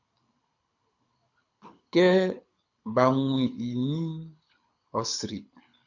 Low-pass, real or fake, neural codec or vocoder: 7.2 kHz; fake; codec, 24 kHz, 6 kbps, HILCodec